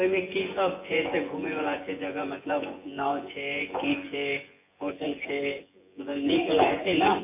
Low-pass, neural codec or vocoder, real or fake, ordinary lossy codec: 3.6 kHz; vocoder, 24 kHz, 100 mel bands, Vocos; fake; MP3, 24 kbps